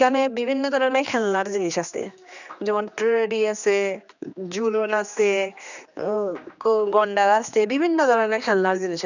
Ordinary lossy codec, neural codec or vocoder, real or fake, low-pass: none; codec, 16 kHz, 2 kbps, X-Codec, HuBERT features, trained on general audio; fake; 7.2 kHz